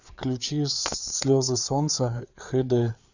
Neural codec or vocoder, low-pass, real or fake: codec, 16 kHz, 4 kbps, FreqCodec, larger model; 7.2 kHz; fake